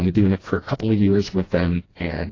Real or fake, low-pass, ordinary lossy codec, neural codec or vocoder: fake; 7.2 kHz; AAC, 32 kbps; codec, 16 kHz, 1 kbps, FreqCodec, smaller model